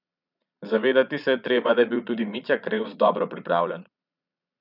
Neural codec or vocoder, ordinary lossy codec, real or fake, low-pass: vocoder, 22.05 kHz, 80 mel bands, Vocos; none; fake; 5.4 kHz